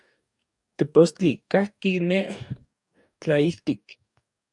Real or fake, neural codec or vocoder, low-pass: fake; codec, 44.1 kHz, 2.6 kbps, DAC; 10.8 kHz